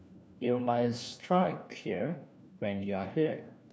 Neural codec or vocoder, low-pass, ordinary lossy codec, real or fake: codec, 16 kHz, 1 kbps, FunCodec, trained on LibriTTS, 50 frames a second; none; none; fake